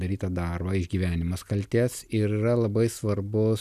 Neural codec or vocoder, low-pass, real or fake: none; 14.4 kHz; real